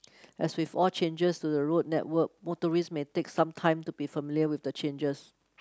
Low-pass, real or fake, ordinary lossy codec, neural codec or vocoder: none; real; none; none